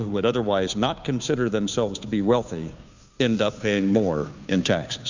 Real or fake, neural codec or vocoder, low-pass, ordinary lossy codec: fake; codec, 16 kHz, 2 kbps, FunCodec, trained on Chinese and English, 25 frames a second; 7.2 kHz; Opus, 64 kbps